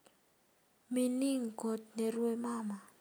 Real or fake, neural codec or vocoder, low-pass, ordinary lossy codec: real; none; none; none